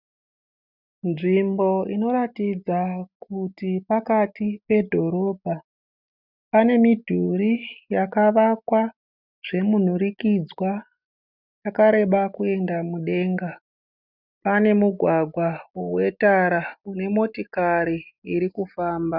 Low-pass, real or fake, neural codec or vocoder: 5.4 kHz; real; none